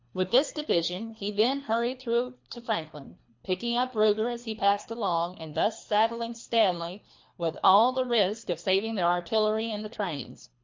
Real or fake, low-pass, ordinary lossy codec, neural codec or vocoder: fake; 7.2 kHz; MP3, 48 kbps; codec, 24 kHz, 3 kbps, HILCodec